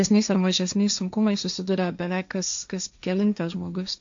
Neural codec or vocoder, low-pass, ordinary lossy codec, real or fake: codec, 16 kHz, 1.1 kbps, Voila-Tokenizer; 7.2 kHz; AAC, 64 kbps; fake